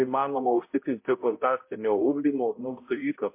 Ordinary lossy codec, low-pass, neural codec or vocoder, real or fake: MP3, 24 kbps; 3.6 kHz; codec, 16 kHz, 0.5 kbps, X-Codec, HuBERT features, trained on balanced general audio; fake